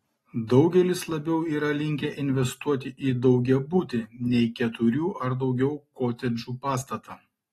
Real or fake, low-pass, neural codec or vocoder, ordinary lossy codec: real; 19.8 kHz; none; AAC, 32 kbps